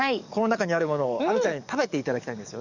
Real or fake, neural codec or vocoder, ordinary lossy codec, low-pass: fake; codec, 44.1 kHz, 7.8 kbps, DAC; none; 7.2 kHz